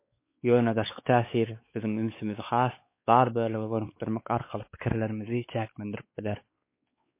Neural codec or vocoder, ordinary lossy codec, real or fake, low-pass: codec, 16 kHz, 4 kbps, X-Codec, WavLM features, trained on Multilingual LibriSpeech; MP3, 24 kbps; fake; 3.6 kHz